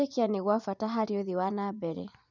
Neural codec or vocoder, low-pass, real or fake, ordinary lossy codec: vocoder, 24 kHz, 100 mel bands, Vocos; 7.2 kHz; fake; none